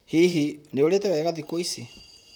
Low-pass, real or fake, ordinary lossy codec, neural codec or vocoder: 19.8 kHz; fake; none; vocoder, 44.1 kHz, 128 mel bands, Pupu-Vocoder